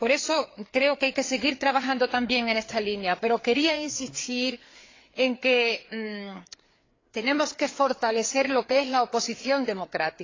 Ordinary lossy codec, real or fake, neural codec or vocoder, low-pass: AAC, 32 kbps; fake; codec, 16 kHz, 4 kbps, FreqCodec, larger model; 7.2 kHz